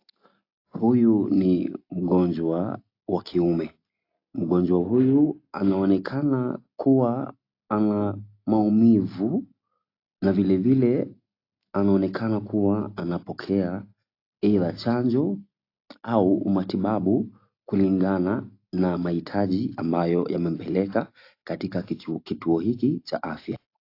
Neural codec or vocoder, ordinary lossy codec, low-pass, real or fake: none; AAC, 32 kbps; 5.4 kHz; real